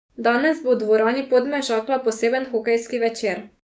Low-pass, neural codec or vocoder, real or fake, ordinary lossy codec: none; codec, 16 kHz, 6 kbps, DAC; fake; none